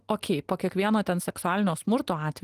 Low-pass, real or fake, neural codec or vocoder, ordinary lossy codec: 14.4 kHz; real; none; Opus, 24 kbps